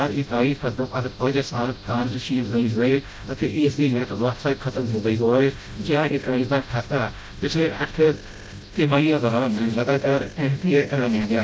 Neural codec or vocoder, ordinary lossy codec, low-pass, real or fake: codec, 16 kHz, 0.5 kbps, FreqCodec, smaller model; none; none; fake